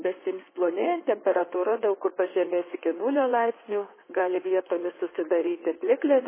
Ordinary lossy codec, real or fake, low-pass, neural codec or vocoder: MP3, 16 kbps; fake; 3.6 kHz; codec, 16 kHz in and 24 kHz out, 2.2 kbps, FireRedTTS-2 codec